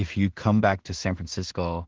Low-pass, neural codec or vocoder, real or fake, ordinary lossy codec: 7.2 kHz; codec, 16 kHz in and 24 kHz out, 0.4 kbps, LongCat-Audio-Codec, two codebook decoder; fake; Opus, 16 kbps